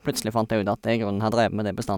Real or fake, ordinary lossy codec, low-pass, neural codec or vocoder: real; none; 19.8 kHz; none